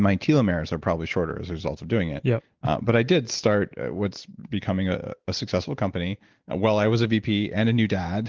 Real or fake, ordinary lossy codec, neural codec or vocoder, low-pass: real; Opus, 16 kbps; none; 7.2 kHz